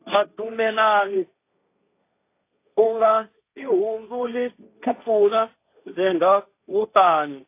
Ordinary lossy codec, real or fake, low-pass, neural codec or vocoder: AAC, 24 kbps; fake; 3.6 kHz; codec, 16 kHz, 1.1 kbps, Voila-Tokenizer